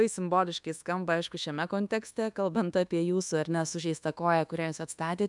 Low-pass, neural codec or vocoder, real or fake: 10.8 kHz; codec, 24 kHz, 1.2 kbps, DualCodec; fake